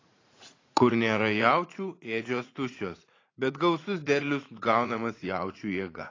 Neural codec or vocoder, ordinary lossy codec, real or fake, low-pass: vocoder, 44.1 kHz, 80 mel bands, Vocos; AAC, 32 kbps; fake; 7.2 kHz